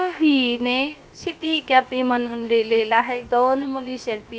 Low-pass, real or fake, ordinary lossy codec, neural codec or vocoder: none; fake; none; codec, 16 kHz, about 1 kbps, DyCAST, with the encoder's durations